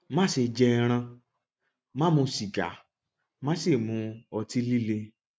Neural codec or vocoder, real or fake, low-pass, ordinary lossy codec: none; real; none; none